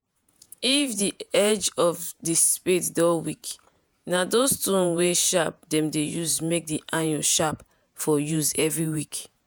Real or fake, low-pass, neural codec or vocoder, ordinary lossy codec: fake; none; vocoder, 48 kHz, 128 mel bands, Vocos; none